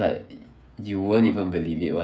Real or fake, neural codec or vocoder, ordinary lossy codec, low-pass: fake; codec, 16 kHz, 8 kbps, FreqCodec, smaller model; none; none